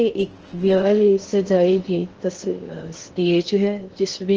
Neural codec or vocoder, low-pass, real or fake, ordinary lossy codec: codec, 16 kHz in and 24 kHz out, 0.6 kbps, FocalCodec, streaming, 4096 codes; 7.2 kHz; fake; Opus, 16 kbps